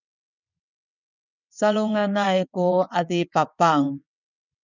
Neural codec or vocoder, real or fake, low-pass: vocoder, 22.05 kHz, 80 mel bands, WaveNeXt; fake; 7.2 kHz